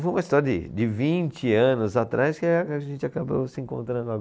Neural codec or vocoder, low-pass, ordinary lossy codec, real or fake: none; none; none; real